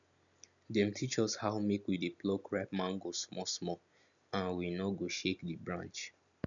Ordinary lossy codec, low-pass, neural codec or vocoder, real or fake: none; 7.2 kHz; none; real